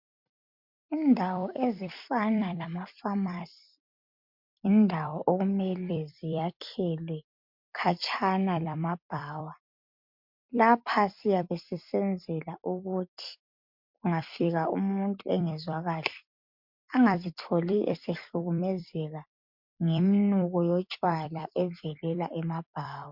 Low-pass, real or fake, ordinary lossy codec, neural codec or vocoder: 5.4 kHz; fake; MP3, 48 kbps; vocoder, 44.1 kHz, 128 mel bands every 256 samples, BigVGAN v2